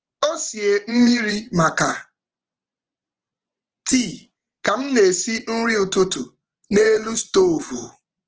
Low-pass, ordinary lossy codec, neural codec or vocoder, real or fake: 7.2 kHz; Opus, 16 kbps; vocoder, 24 kHz, 100 mel bands, Vocos; fake